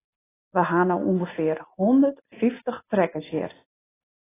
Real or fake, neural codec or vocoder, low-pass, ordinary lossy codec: real; none; 3.6 kHz; AAC, 16 kbps